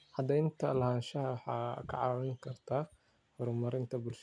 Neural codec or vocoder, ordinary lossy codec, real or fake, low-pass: none; MP3, 96 kbps; real; 9.9 kHz